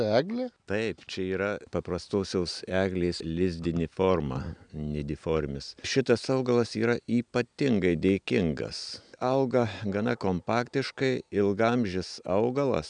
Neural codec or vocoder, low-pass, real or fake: none; 9.9 kHz; real